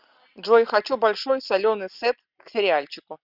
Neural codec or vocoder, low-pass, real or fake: none; 5.4 kHz; real